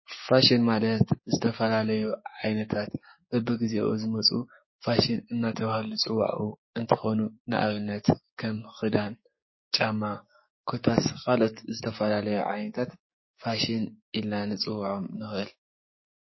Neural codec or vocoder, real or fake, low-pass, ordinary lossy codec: codec, 16 kHz, 6 kbps, DAC; fake; 7.2 kHz; MP3, 24 kbps